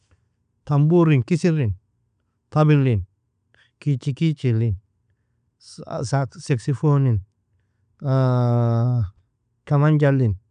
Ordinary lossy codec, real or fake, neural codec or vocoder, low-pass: none; real; none; 9.9 kHz